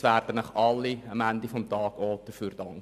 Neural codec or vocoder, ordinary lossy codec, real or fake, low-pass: none; none; real; 14.4 kHz